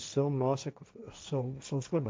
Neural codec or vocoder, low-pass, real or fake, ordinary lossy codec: codec, 16 kHz, 1.1 kbps, Voila-Tokenizer; none; fake; none